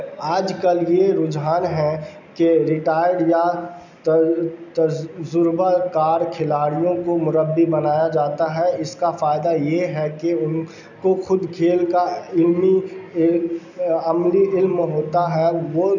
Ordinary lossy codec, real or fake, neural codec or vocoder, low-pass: none; real; none; 7.2 kHz